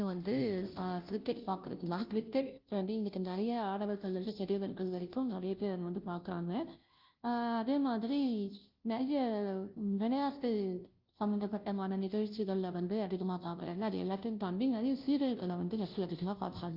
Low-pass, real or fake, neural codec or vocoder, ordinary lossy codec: 5.4 kHz; fake; codec, 16 kHz, 0.5 kbps, FunCodec, trained on Chinese and English, 25 frames a second; Opus, 32 kbps